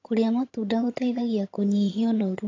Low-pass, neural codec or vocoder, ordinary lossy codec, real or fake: 7.2 kHz; vocoder, 22.05 kHz, 80 mel bands, HiFi-GAN; AAC, 32 kbps; fake